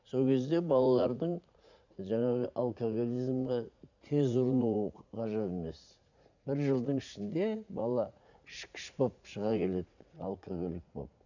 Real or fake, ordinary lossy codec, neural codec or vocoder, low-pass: fake; none; vocoder, 44.1 kHz, 80 mel bands, Vocos; 7.2 kHz